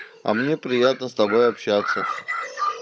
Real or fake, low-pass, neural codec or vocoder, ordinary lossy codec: fake; none; codec, 16 kHz, 16 kbps, FunCodec, trained on Chinese and English, 50 frames a second; none